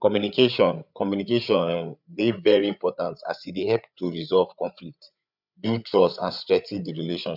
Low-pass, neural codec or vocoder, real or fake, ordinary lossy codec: 5.4 kHz; codec, 16 kHz, 8 kbps, FreqCodec, larger model; fake; none